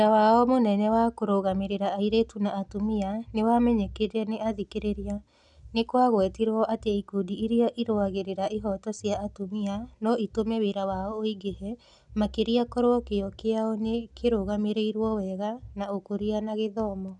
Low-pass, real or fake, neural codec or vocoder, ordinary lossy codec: 10.8 kHz; real; none; none